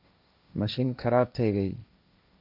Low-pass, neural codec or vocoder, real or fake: 5.4 kHz; codec, 16 kHz, 1.1 kbps, Voila-Tokenizer; fake